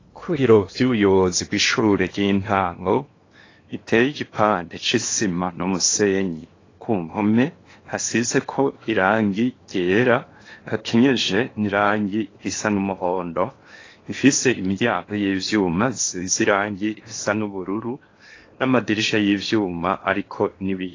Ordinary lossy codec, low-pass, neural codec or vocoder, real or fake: AAC, 32 kbps; 7.2 kHz; codec, 16 kHz in and 24 kHz out, 0.8 kbps, FocalCodec, streaming, 65536 codes; fake